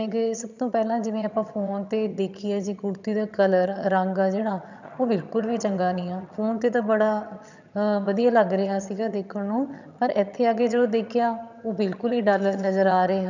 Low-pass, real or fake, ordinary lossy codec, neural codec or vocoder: 7.2 kHz; fake; none; vocoder, 22.05 kHz, 80 mel bands, HiFi-GAN